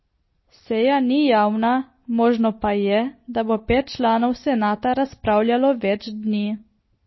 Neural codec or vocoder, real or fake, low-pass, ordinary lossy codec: none; real; 7.2 kHz; MP3, 24 kbps